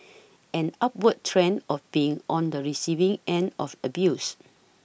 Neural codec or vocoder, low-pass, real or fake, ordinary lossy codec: none; none; real; none